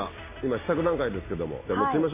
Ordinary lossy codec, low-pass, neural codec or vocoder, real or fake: MP3, 16 kbps; 3.6 kHz; none; real